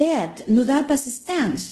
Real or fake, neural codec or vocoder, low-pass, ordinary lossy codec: fake; codec, 24 kHz, 0.5 kbps, DualCodec; 10.8 kHz; Opus, 16 kbps